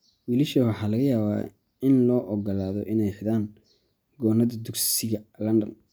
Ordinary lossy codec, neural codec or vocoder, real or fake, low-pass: none; none; real; none